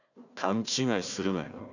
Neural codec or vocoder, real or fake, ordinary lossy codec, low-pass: codec, 16 kHz, 1 kbps, FunCodec, trained on Chinese and English, 50 frames a second; fake; none; 7.2 kHz